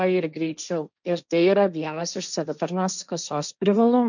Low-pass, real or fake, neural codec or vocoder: 7.2 kHz; fake; codec, 16 kHz, 1.1 kbps, Voila-Tokenizer